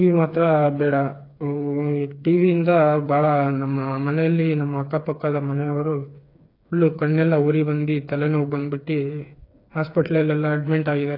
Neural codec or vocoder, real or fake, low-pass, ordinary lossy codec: codec, 16 kHz, 4 kbps, FreqCodec, smaller model; fake; 5.4 kHz; AAC, 32 kbps